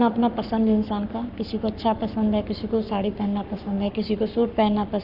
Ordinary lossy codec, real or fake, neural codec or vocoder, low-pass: none; fake; codec, 44.1 kHz, 7.8 kbps, Pupu-Codec; 5.4 kHz